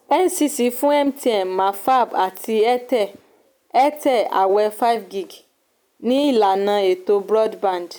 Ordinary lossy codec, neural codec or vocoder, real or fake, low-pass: none; none; real; none